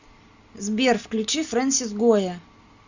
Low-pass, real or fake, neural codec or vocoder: 7.2 kHz; real; none